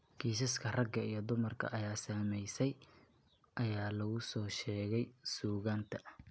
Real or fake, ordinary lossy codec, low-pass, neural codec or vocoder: real; none; none; none